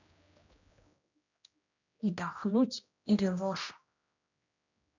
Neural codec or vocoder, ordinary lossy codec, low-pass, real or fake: codec, 16 kHz, 1 kbps, X-Codec, HuBERT features, trained on general audio; none; 7.2 kHz; fake